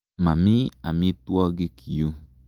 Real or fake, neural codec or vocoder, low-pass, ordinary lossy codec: real; none; 19.8 kHz; Opus, 24 kbps